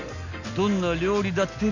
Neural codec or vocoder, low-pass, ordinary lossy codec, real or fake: none; 7.2 kHz; none; real